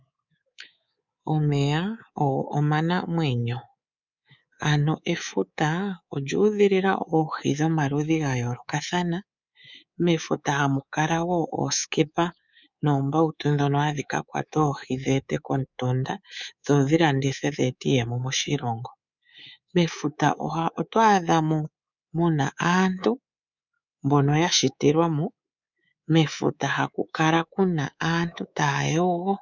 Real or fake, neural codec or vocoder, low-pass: fake; codec, 24 kHz, 3.1 kbps, DualCodec; 7.2 kHz